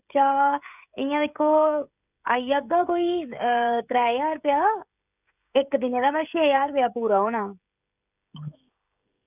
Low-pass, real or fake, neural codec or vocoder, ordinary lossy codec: 3.6 kHz; fake; codec, 16 kHz, 16 kbps, FreqCodec, smaller model; none